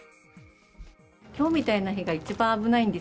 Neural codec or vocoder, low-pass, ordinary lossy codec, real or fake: none; none; none; real